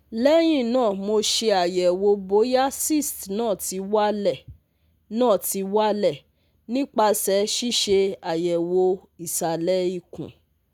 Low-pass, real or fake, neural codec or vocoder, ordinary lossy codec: none; real; none; none